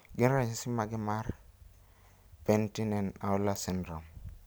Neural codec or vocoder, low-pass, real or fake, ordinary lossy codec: none; none; real; none